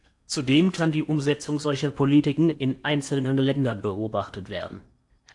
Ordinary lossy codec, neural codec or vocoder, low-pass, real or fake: AAC, 64 kbps; codec, 16 kHz in and 24 kHz out, 0.8 kbps, FocalCodec, streaming, 65536 codes; 10.8 kHz; fake